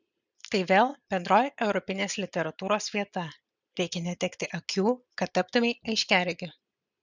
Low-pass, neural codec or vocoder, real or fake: 7.2 kHz; none; real